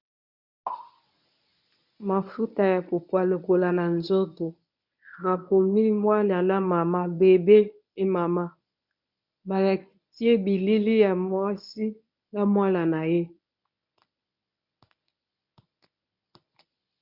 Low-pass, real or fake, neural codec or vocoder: 5.4 kHz; fake; codec, 24 kHz, 0.9 kbps, WavTokenizer, medium speech release version 1